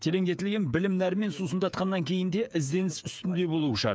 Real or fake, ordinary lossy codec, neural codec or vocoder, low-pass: fake; none; codec, 16 kHz, 8 kbps, FreqCodec, smaller model; none